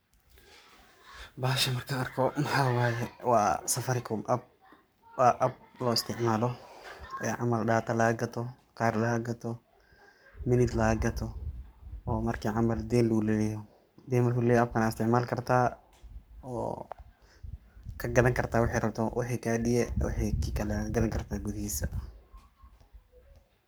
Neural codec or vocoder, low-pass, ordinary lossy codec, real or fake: codec, 44.1 kHz, 7.8 kbps, Pupu-Codec; none; none; fake